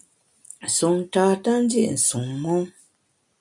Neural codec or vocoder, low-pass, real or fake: none; 10.8 kHz; real